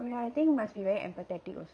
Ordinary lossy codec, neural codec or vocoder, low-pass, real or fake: none; vocoder, 22.05 kHz, 80 mel bands, Vocos; none; fake